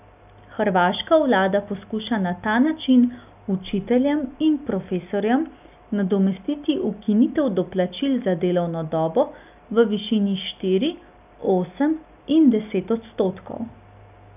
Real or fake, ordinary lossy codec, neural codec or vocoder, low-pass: real; none; none; 3.6 kHz